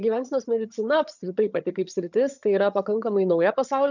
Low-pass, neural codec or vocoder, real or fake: 7.2 kHz; vocoder, 22.05 kHz, 80 mel bands, HiFi-GAN; fake